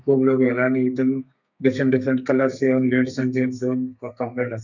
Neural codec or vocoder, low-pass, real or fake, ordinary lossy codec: codec, 32 kHz, 1.9 kbps, SNAC; 7.2 kHz; fake; none